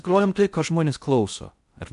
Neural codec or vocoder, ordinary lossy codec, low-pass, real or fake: codec, 16 kHz in and 24 kHz out, 0.6 kbps, FocalCodec, streaming, 4096 codes; AAC, 96 kbps; 10.8 kHz; fake